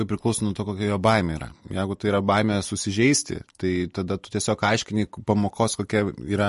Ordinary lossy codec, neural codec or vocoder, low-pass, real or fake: MP3, 48 kbps; none; 14.4 kHz; real